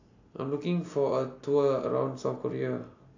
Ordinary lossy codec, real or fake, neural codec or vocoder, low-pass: AAC, 48 kbps; real; none; 7.2 kHz